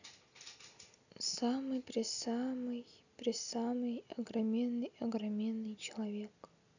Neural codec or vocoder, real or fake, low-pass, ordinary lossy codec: none; real; 7.2 kHz; none